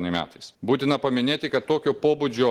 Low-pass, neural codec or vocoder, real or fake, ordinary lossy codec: 19.8 kHz; none; real; Opus, 16 kbps